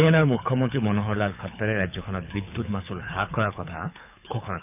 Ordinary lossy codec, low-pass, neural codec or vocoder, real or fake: none; 3.6 kHz; codec, 24 kHz, 6 kbps, HILCodec; fake